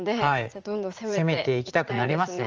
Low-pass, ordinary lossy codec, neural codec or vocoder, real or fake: 7.2 kHz; Opus, 24 kbps; vocoder, 44.1 kHz, 80 mel bands, Vocos; fake